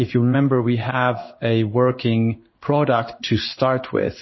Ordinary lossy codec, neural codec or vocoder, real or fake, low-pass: MP3, 24 kbps; codec, 16 kHz in and 24 kHz out, 1 kbps, XY-Tokenizer; fake; 7.2 kHz